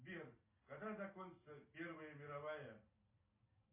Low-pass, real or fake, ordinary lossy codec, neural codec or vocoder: 3.6 kHz; real; MP3, 24 kbps; none